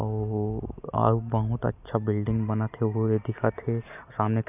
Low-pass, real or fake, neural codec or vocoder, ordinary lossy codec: 3.6 kHz; real; none; none